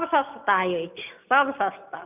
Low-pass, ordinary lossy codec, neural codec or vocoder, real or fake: 3.6 kHz; none; none; real